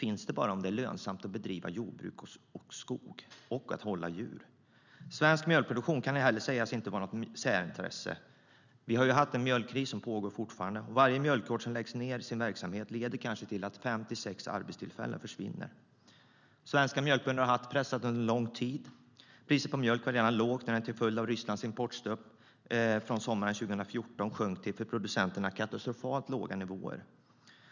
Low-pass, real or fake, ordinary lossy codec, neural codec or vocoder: 7.2 kHz; real; none; none